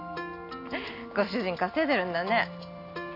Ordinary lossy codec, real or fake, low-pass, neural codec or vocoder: none; real; 5.4 kHz; none